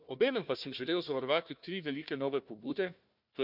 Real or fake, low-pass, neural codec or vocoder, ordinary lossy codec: fake; 5.4 kHz; codec, 16 kHz, 1 kbps, FunCodec, trained on Chinese and English, 50 frames a second; none